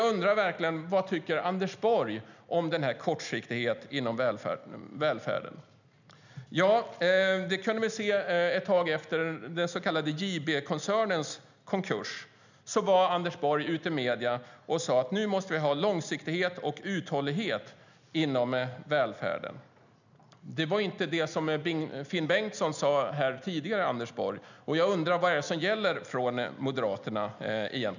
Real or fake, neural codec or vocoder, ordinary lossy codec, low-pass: real; none; none; 7.2 kHz